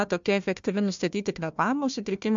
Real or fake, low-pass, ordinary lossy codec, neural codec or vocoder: fake; 7.2 kHz; MP3, 64 kbps; codec, 16 kHz, 1 kbps, FunCodec, trained on Chinese and English, 50 frames a second